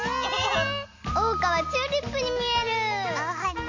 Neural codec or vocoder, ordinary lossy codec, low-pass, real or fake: none; MP3, 48 kbps; 7.2 kHz; real